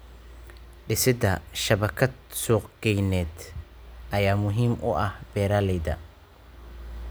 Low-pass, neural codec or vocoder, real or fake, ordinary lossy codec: none; none; real; none